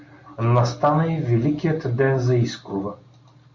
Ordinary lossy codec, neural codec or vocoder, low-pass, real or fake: MP3, 48 kbps; none; 7.2 kHz; real